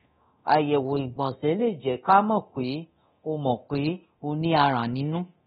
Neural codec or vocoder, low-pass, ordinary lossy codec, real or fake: codec, 24 kHz, 0.9 kbps, DualCodec; 10.8 kHz; AAC, 16 kbps; fake